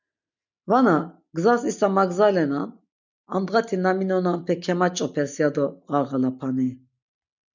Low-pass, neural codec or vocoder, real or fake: 7.2 kHz; none; real